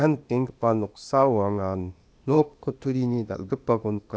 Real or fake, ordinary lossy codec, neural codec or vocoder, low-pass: fake; none; codec, 16 kHz, 0.8 kbps, ZipCodec; none